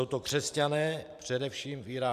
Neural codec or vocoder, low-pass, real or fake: vocoder, 44.1 kHz, 128 mel bands every 512 samples, BigVGAN v2; 14.4 kHz; fake